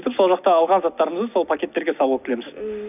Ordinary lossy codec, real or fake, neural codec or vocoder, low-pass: none; real; none; 3.6 kHz